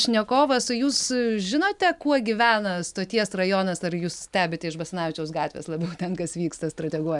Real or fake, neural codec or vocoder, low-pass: real; none; 10.8 kHz